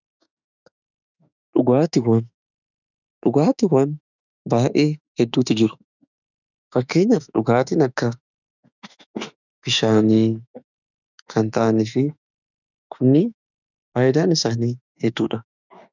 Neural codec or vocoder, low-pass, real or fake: autoencoder, 48 kHz, 32 numbers a frame, DAC-VAE, trained on Japanese speech; 7.2 kHz; fake